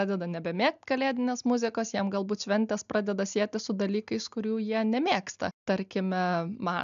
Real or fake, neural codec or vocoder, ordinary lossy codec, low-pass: real; none; AAC, 96 kbps; 7.2 kHz